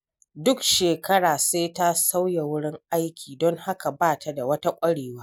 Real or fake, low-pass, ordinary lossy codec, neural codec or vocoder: real; none; none; none